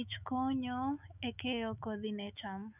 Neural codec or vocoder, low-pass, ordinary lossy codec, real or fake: autoencoder, 48 kHz, 128 numbers a frame, DAC-VAE, trained on Japanese speech; 3.6 kHz; none; fake